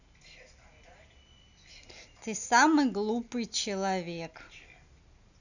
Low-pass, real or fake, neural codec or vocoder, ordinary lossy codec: 7.2 kHz; real; none; none